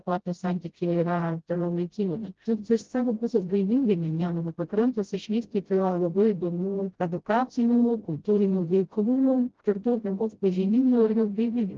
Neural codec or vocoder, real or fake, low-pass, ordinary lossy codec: codec, 16 kHz, 0.5 kbps, FreqCodec, smaller model; fake; 7.2 kHz; Opus, 16 kbps